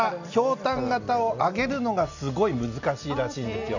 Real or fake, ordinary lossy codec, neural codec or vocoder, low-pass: real; none; none; 7.2 kHz